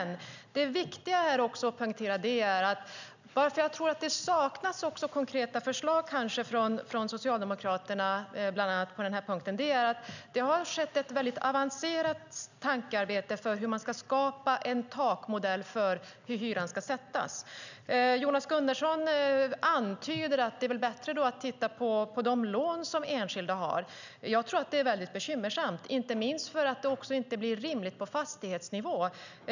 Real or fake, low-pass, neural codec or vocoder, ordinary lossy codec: real; 7.2 kHz; none; none